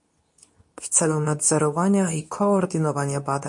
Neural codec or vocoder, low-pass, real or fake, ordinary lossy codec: codec, 24 kHz, 0.9 kbps, WavTokenizer, medium speech release version 2; 10.8 kHz; fake; MP3, 48 kbps